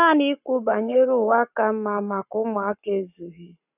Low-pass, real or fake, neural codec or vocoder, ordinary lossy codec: 3.6 kHz; fake; codec, 44.1 kHz, 7.8 kbps, Pupu-Codec; none